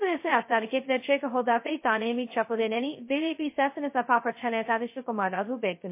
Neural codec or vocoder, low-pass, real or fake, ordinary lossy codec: codec, 16 kHz, 0.2 kbps, FocalCodec; 3.6 kHz; fake; MP3, 24 kbps